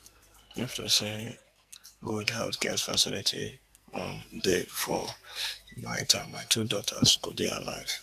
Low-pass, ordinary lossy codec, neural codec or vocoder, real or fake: 14.4 kHz; none; codec, 44.1 kHz, 2.6 kbps, SNAC; fake